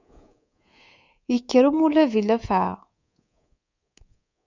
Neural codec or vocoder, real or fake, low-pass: codec, 24 kHz, 3.1 kbps, DualCodec; fake; 7.2 kHz